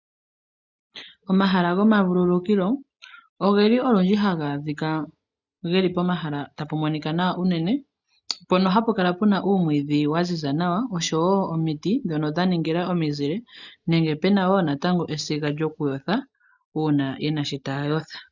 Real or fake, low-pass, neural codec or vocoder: real; 7.2 kHz; none